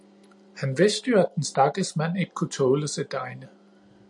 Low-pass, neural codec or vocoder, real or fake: 10.8 kHz; none; real